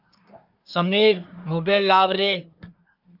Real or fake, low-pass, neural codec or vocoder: fake; 5.4 kHz; codec, 24 kHz, 1 kbps, SNAC